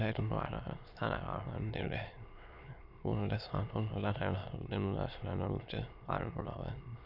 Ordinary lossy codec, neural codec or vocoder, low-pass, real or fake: none; autoencoder, 22.05 kHz, a latent of 192 numbers a frame, VITS, trained on many speakers; 5.4 kHz; fake